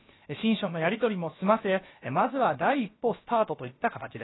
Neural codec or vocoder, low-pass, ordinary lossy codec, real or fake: codec, 16 kHz, about 1 kbps, DyCAST, with the encoder's durations; 7.2 kHz; AAC, 16 kbps; fake